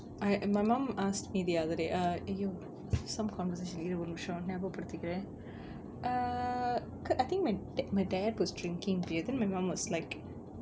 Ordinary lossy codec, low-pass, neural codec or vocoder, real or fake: none; none; none; real